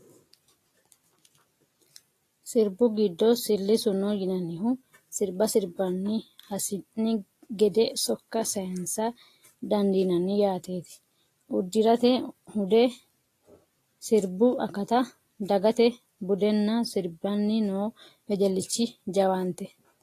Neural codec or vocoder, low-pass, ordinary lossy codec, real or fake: none; 14.4 kHz; AAC, 48 kbps; real